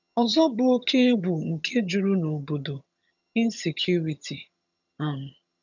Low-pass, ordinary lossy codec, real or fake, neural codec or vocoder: 7.2 kHz; none; fake; vocoder, 22.05 kHz, 80 mel bands, HiFi-GAN